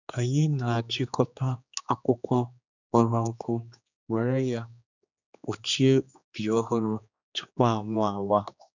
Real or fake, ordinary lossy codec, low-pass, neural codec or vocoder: fake; none; 7.2 kHz; codec, 16 kHz, 2 kbps, X-Codec, HuBERT features, trained on general audio